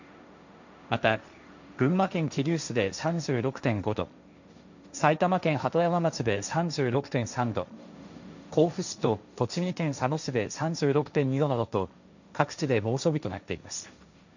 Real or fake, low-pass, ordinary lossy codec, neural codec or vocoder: fake; 7.2 kHz; none; codec, 16 kHz, 1.1 kbps, Voila-Tokenizer